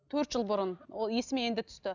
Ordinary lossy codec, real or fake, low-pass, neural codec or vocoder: none; real; 7.2 kHz; none